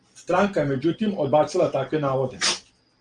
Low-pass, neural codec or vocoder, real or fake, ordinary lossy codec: 9.9 kHz; none; real; Opus, 24 kbps